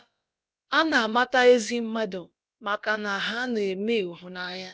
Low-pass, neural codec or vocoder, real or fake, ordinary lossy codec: none; codec, 16 kHz, about 1 kbps, DyCAST, with the encoder's durations; fake; none